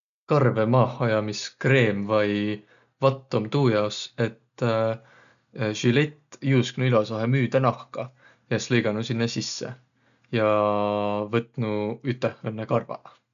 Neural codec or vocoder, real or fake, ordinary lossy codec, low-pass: none; real; none; 7.2 kHz